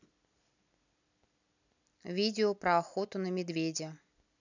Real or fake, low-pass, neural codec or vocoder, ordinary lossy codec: real; 7.2 kHz; none; none